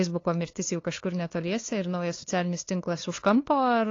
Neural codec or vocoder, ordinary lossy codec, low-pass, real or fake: codec, 16 kHz, 2 kbps, FunCodec, trained on LibriTTS, 25 frames a second; AAC, 32 kbps; 7.2 kHz; fake